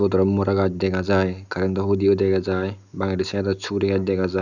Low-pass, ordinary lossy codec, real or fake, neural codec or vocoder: 7.2 kHz; none; real; none